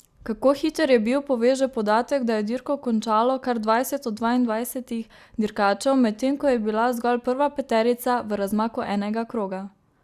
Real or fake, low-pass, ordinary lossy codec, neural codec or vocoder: real; 14.4 kHz; Opus, 64 kbps; none